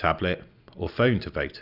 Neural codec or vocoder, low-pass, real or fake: none; 5.4 kHz; real